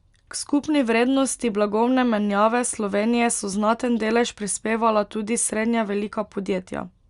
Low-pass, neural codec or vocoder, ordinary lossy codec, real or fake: 10.8 kHz; none; Opus, 64 kbps; real